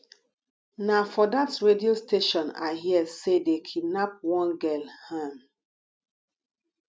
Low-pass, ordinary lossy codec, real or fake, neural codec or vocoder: none; none; real; none